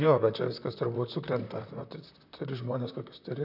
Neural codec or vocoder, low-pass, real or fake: vocoder, 44.1 kHz, 128 mel bands, Pupu-Vocoder; 5.4 kHz; fake